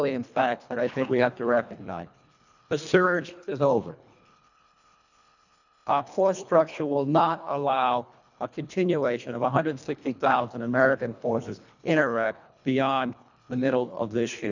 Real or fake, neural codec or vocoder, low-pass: fake; codec, 24 kHz, 1.5 kbps, HILCodec; 7.2 kHz